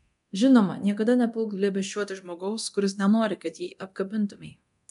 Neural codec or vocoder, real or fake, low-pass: codec, 24 kHz, 0.9 kbps, DualCodec; fake; 10.8 kHz